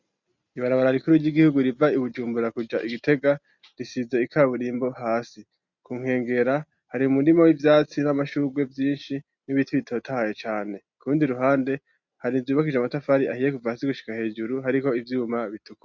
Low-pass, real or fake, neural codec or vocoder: 7.2 kHz; real; none